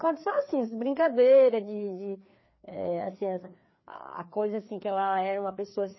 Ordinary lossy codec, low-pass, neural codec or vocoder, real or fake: MP3, 24 kbps; 7.2 kHz; codec, 16 kHz, 2 kbps, FreqCodec, larger model; fake